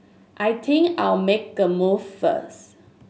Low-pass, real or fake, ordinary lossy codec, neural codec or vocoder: none; real; none; none